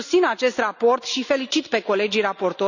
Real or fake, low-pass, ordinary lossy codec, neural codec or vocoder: real; 7.2 kHz; none; none